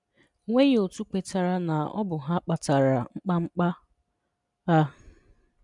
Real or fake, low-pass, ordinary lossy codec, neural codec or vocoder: real; 10.8 kHz; none; none